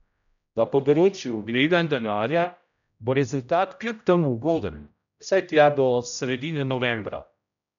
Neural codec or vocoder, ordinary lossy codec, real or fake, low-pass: codec, 16 kHz, 0.5 kbps, X-Codec, HuBERT features, trained on general audio; none; fake; 7.2 kHz